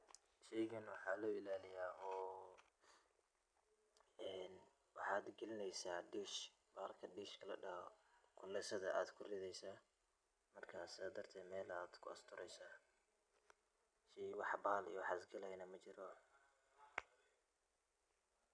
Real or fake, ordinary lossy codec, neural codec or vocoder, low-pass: real; none; none; 9.9 kHz